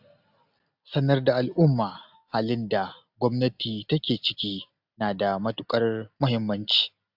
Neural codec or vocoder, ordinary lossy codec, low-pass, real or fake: none; AAC, 48 kbps; 5.4 kHz; real